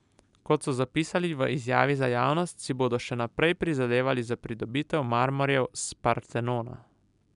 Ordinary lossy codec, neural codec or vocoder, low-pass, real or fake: MP3, 96 kbps; none; 10.8 kHz; real